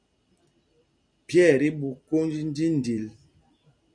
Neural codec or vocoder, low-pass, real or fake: none; 9.9 kHz; real